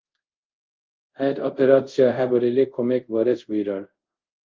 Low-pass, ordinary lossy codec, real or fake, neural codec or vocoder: 7.2 kHz; Opus, 24 kbps; fake; codec, 24 kHz, 0.5 kbps, DualCodec